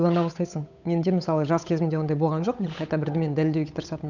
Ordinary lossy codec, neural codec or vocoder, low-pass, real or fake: none; codec, 16 kHz, 8 kbps, FunCodec, trained on Chinese and English, 25 frames a second; 7.2 kHz; fake